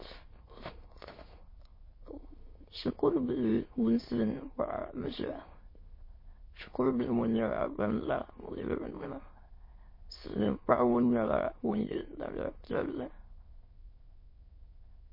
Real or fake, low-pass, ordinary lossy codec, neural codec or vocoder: fake; 5.4 kHz; MP3, 24 kbps; autoencoder, 22.05 kHz, a latent of 192 numbers a frame, VITS, trained on many speakers